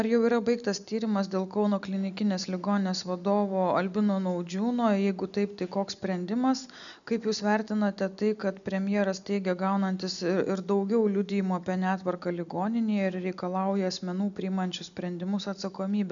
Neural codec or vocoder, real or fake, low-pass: none; real; 7.2 kHz